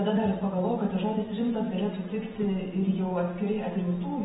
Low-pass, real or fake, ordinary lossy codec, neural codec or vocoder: 9.9 kHz; real; AAC, 16 kbps; none